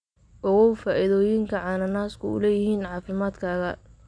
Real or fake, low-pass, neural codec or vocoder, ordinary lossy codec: real; 9.9 kHz; none; none